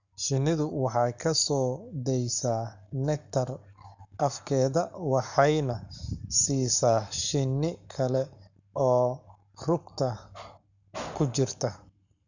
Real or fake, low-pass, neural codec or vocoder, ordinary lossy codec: fake; 7.2 kHz; codec, 44.1 kHz, 7.8 kbps, Pupu-Codec; none